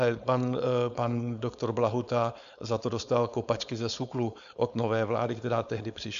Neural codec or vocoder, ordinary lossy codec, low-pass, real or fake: codec, 16 kHz, 4.8 kbps, FACodec; AAC, 96 kbps; 7.2 kHz; fake